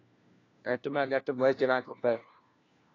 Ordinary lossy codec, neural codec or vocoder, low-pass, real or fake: AAC, 32 kbps; codec, 16 kHz, 1 kbps, FunCodec, trained on LibriTTS, 50 frames a second; 7.2 kHz; fake